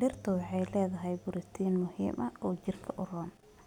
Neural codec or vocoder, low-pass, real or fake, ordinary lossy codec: none; 19.8 kHz; real; none